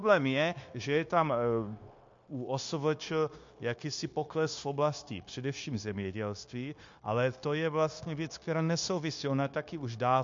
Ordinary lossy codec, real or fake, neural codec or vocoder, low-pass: MP3, 48 kbps; fake; codec, 16 kHz, 0.9 kbps, LongCat-Audio-Codec; 7.2 kHz